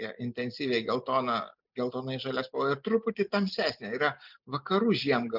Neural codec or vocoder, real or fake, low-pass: none; real; 5.4 kHz